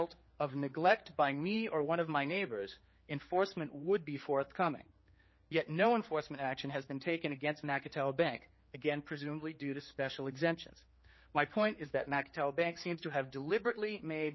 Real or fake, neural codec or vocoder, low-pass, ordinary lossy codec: fake; codec, 16 kHz, 4 kbps, X-Codec, HuBERT features, trained on general audio; 7.2 kHz; MP3, 24 kbps